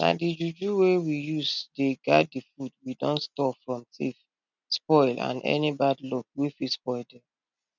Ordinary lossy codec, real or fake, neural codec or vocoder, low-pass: none; real; none; 7.2 kHz